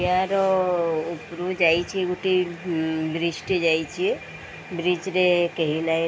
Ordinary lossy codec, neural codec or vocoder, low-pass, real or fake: none; none; none; real